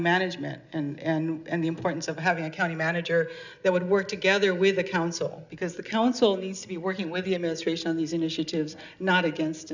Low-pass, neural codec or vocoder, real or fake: 7.2 kHz; none; real